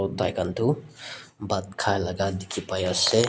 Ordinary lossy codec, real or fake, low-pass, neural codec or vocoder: none; real; none; none